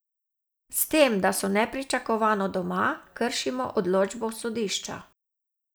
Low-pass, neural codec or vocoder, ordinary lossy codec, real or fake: none; none; none; real